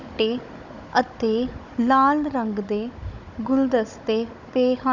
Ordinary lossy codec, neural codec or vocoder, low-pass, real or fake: none; codec, 16 kHz, 16 kbps, FunCodec, trained on Chinese and English, 50 frames a second; 7.2 kHz; fake